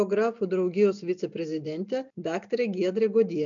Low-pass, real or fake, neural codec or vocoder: 7.2 kHz; real; none